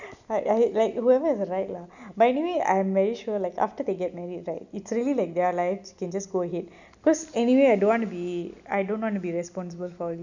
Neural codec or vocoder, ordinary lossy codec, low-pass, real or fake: none; none; 7.2 kHz; real